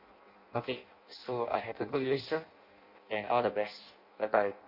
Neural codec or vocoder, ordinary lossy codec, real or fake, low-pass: codec, 16 kHz in and 24 kHz out, 0.6 kbps, FireRedTTS-2 codec; MP3, 32 kbps; fake; 5.4 kHz